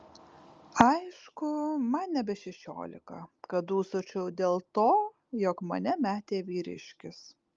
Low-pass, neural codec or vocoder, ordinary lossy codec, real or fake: 7.2 kHz; none; Opus, 32 kbps; real